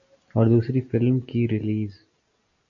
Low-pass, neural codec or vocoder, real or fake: 7.2 kHz; none; real